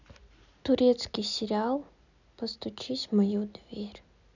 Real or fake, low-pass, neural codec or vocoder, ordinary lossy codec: real; 7.2 kHz; none; none